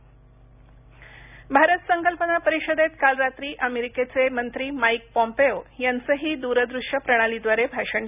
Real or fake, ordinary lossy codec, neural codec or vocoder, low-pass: real; none; none; 3.6 kHz